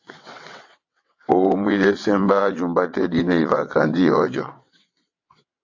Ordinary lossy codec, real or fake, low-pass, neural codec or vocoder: AAC, 48 kbps; fake; 7.2 kHz; vocoder, 22.05 kHz, 80 mel bands, WaveNeXt